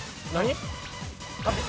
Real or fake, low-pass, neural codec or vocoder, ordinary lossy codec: real; none; none; none